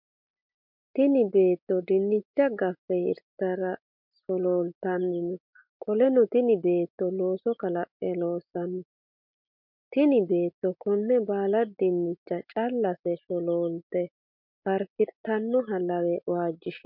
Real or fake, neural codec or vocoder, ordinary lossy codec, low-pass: real; none; MP3, 48 kbps; 5.4 kHz